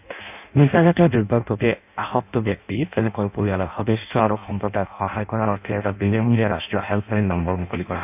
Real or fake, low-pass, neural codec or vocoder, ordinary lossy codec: fake; 3.6 kHz; codec, 16 kHz in and 24 kHz out, 0.6 kbps, FireRedTTS-2 codec; none